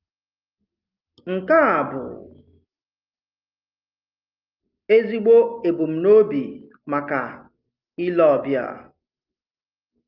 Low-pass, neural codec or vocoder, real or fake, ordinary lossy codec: 5.4 kHz; none; real; Opus, 24 kbps